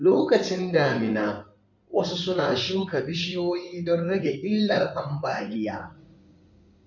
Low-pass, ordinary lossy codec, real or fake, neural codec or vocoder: 7.2 kHz; none; fake; codec, 16 kHz in and 24 kHz out, 2.2 kbps, FireRedTTS-2 codec